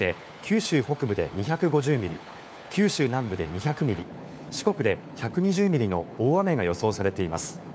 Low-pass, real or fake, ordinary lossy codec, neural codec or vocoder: none; fake; none; codec, 16 kHz, 4 kbps, FunCodec, trained on LibriTTS, 50 frames a second